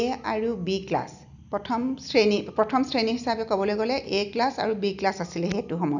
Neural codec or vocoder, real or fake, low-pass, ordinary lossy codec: none; real; 7.2 kHz; none